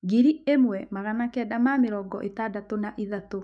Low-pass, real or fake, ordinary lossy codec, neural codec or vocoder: 9.9 kHz; fake; none; autoencoder, 48 kHz, 128 numbers a frame, DAC-VAE, trained on Japanese speech